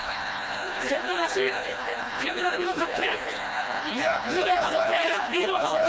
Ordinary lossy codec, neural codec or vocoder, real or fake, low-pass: none; codec, 16 kHz, 1 kbps, FreqCodec, smaller model; fake; none